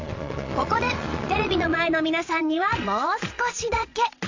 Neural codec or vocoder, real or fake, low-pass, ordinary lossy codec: vocoder, 22.05 kHz, 80 mel bands, Vocos; fake; 7.2 kHz; AAC, 48 kbps